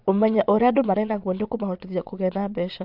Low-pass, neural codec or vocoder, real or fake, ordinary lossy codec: 5.4 kHz; vocoder, 22.05 kHz, 80 mel bands, Vocos; fake; MP3, 48 kbps